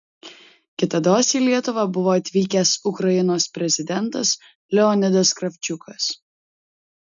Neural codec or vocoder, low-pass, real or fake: none; 7.2 kHz; real